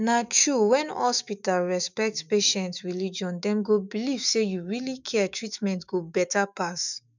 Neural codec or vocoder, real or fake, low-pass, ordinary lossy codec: autoencoder, 48 kHz, 128 numbers a frame, DAC-VAE, trained on Japanese speech; fake; 7.2 kHz; none